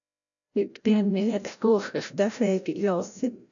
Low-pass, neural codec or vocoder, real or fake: 7.2 kHz; codec, 16 kHz, 0.5 kbps, FreqCodec, larger model; fake